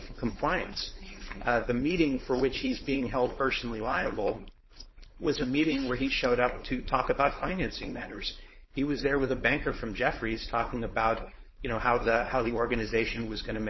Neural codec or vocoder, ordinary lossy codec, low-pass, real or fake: codec, 16 kHz, 4.8 kbps, FACodec; MP3, 24 kbps; 7.2 kHz; fake